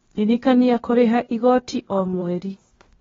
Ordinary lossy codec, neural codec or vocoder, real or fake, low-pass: AAC, 24 kbps; codec, 16 kHz, 0.8 kbps, ZipCodec; fake; 7.2 kHz